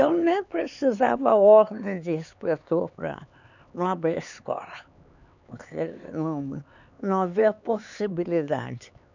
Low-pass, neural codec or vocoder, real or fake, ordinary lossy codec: 7.2 kHz; codec, 16 kHz, 4 kbps, X-Codec, HuBERT features, trained on LibriSpeech; fake; none